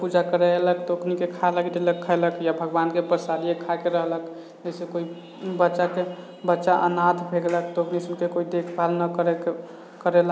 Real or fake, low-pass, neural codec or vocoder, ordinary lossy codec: real; none; none; none